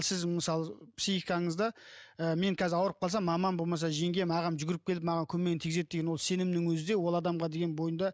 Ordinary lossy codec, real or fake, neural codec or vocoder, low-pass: none; real; none; none